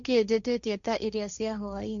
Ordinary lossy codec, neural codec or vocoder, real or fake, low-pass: none; codec, 16 kHz, 1.1 kbps, Voila-Tokenizer; fake; 7.2 kHz